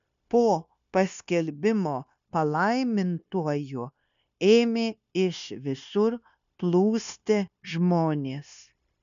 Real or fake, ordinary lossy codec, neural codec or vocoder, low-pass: fake; MP3, 96 kbps; codec, 16 kHz, 0.9 kbps, LongCat-Audio-Codec; 7.2 kHz